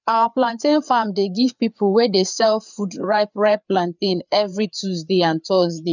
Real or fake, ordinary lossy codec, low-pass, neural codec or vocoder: fake; none; 7.2 kHz; codec, 16 kHz, 4 kbps, FreqCodec, larger model